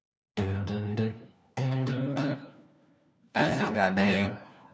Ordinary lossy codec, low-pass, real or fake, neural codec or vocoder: none; none; fake; codec, 16 kHz, 1 kbps, FunCodec, trained on LibriTTS, 50 frames a second